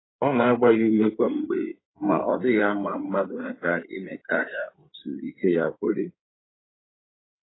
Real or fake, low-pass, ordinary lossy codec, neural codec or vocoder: fake; 7.2 kHz; AAC, 16 kbps; codec, 16 kHz in and 24 kHz out, 2.2 kbps, FireRedTTS-2 codec